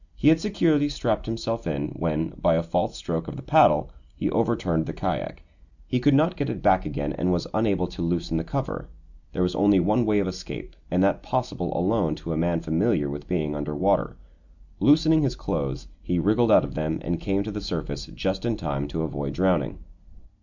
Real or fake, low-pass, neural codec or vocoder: real; 7.2 kHz; none